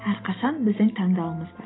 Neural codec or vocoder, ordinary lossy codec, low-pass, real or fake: none; AAC, 16 kbps; 7.2 kHz; real